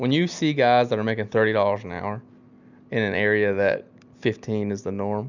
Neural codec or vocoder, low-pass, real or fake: none; 7.2 kHz; real